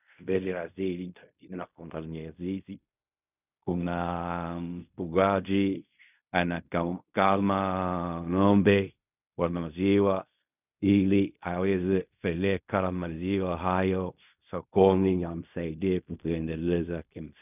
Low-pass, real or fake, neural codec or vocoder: 3.6 kHz; fake; codec, 16 kHz in and 24 kHz out, 0.4 kbps, LongCat-Audio-Codec, fine tuned four codebook decoder